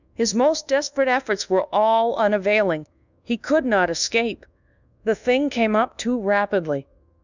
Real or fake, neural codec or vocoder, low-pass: fake; codec, 24 kHz, 1.2 kbps, DualCodec; 7.2 kHz